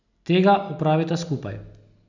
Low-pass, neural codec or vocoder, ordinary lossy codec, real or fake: 7.2 kHz; none; none; real